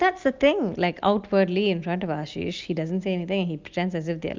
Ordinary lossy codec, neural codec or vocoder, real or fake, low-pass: Opus, 32 kbps; none; real; 7.2 kHz